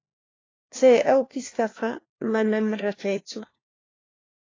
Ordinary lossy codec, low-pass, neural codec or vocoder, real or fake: AAC, 32 kbps; 7.2 kHz; codec, 16 kHz, 1 kbps, FunCodec, trained on LibriTTS, 50 frames a second; fake